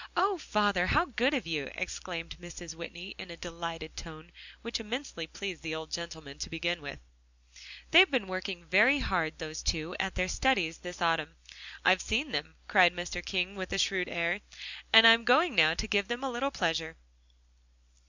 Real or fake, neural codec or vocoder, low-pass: real; none; 7.2 kHz